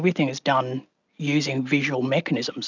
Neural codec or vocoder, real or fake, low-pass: none; real; 7.2 kHz